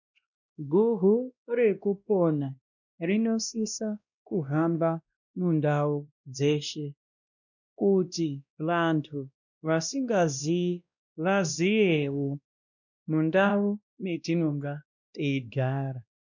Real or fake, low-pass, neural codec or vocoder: fake; 7.2 kHz; codec, 16 kHz, 1 kbps, X-Codec, WavLM features, trained on Multilingual LibriSpeech